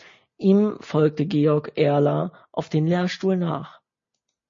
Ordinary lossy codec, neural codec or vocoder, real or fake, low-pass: MP3, 32 kbps; none; real; 7.2 kHz